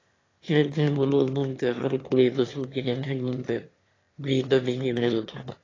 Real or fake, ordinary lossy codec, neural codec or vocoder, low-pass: fake; AAC, 32 kbps; autoencoder, 22.05 kHz, a latent of 192 numbers a frame, VITS, trained on one speaker; 7.2 kHz